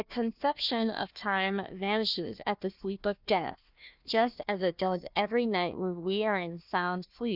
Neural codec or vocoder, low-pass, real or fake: codec, 16 kHz, 1 kbps, FunCodec, trained on Chinese and English, 50 frames a second; 5.4 kHz; fake